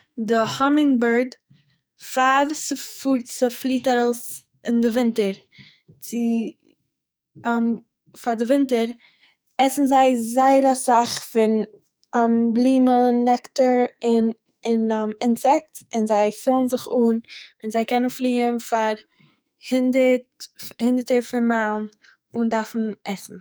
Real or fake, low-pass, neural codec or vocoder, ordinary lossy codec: fake; none; codec, 44.1 kHz, 2.6 kbps, SNAC; none